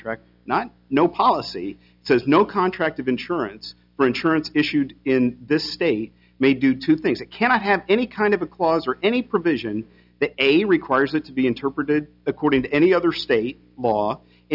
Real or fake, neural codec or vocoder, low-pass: real; none; 5.4 kHz